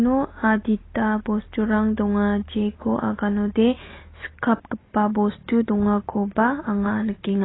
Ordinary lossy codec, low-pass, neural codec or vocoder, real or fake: AAC, 16 kbps; 7.2 kHz; none; real